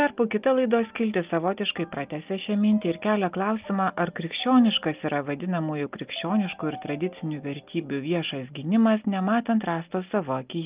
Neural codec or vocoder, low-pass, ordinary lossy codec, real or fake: none; 3.6 kHz; Opus, 24 kbps; real